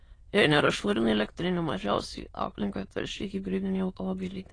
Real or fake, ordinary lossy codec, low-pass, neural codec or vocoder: fake; AAC, 32 kbps; 9.9 kHz; autoencoder, 22.05 kHz, a latent of 192 numbers a frame, VITS, trained on many speakers